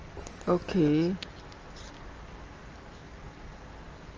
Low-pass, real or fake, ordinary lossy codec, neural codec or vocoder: 7.2 kHz; real; Opus, 24 kbps; none